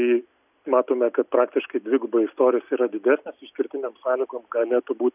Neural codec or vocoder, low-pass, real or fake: none; 3.6 kHz; real